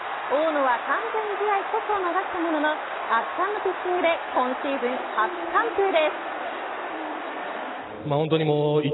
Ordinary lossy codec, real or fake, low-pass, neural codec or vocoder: AAC, 16 kbps; real; 7.2 kHz; none